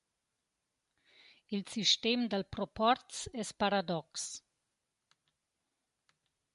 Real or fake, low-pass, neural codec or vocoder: real; 10.8 kHz; none